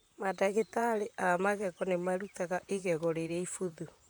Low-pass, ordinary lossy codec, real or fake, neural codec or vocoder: none; none; fake; vocoder, 44.1 kHz, 128 mel bands, Pupu-Vocoder